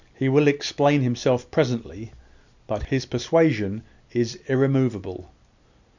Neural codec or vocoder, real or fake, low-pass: none; real; 7.2 kHz